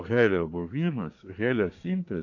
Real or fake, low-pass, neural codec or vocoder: fake; 7.2 kHz; codec, 24 kHz, 1 kbps, SNAC